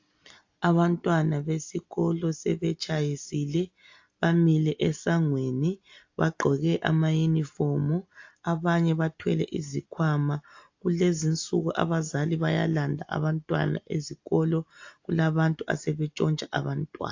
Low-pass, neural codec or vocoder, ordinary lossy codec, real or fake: 7.2 kHz; none; AAC, 48 kbps; real